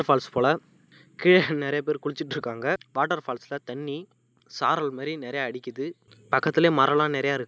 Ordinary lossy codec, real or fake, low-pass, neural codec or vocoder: none; real; none; none